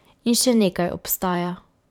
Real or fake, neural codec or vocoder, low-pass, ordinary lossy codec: fake; vocoder, 44.1 kHz, 128 mel bands every 512 samples, BigVGAN v2; 19.8 kHz; none